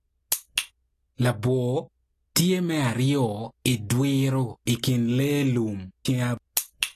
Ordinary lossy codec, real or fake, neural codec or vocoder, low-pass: AAC, 48 kbps; real; none; 14.4 kHz